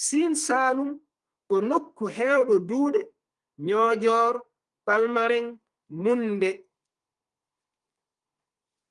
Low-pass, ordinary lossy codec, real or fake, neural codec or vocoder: 10.8 kHz; Opus, 32 kbps; fake; codec, 32 kHz, 1.9 kbps, SNAC